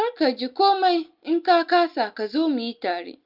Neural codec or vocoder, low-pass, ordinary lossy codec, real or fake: none; 5.4 kHz; Opus, 24 kbps; real